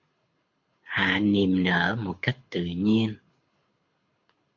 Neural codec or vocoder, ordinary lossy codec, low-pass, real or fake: codec, 24 kHz, 6 kbps, HILCodec; MP3, 48 kbps; 7.2 kHz; fake